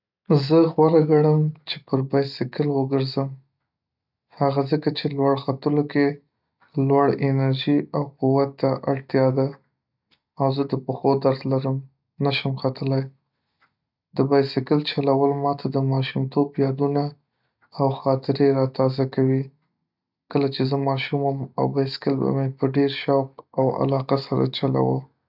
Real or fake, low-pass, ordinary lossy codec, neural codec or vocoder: real; 5.4 kHz; none; none